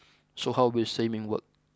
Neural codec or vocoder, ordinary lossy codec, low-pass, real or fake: none; none; none; real